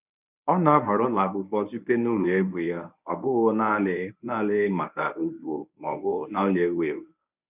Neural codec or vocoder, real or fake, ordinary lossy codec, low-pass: codec, 24 kHz, 0.9 kbps, WavTokenizer, medium speech release version 1; fake; none; 3.6 kHz